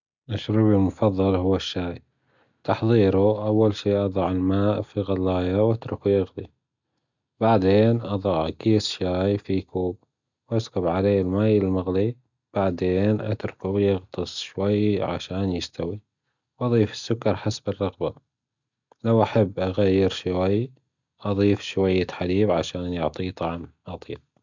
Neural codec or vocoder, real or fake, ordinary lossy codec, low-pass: none; real; none; 7.2 kHz